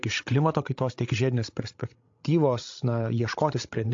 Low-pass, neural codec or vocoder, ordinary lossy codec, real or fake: 7.2 kHz; codec, 16 kHz, 16 kbps, FreqCodec, larger model; AAC, 48 kbps; fake